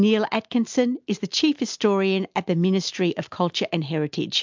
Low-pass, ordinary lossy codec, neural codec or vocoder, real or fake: 7.2 kHz; MP3, 64 kbps; none; real